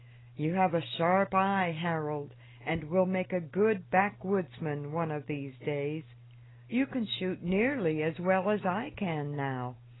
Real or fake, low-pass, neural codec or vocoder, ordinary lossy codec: real; 7.2 kHz; none; AAC, 16 kbps